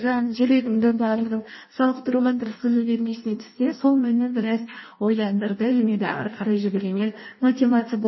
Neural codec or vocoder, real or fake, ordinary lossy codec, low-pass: codec, 16 kHz in and 24 kHz out, 0.6 kbps, FireRedTTS-2 codec; fake; MP3, 24 kbps; 7.2 kHz